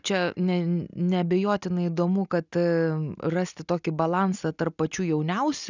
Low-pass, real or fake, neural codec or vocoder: 7.2 kHz; real; none